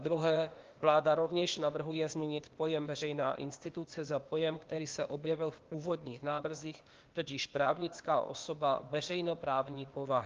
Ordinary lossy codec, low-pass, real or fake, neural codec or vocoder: Opus, 32 kbps; 7.2 kHz; fake; codec, 16 kHz, 0.8 kbps, ZipCodec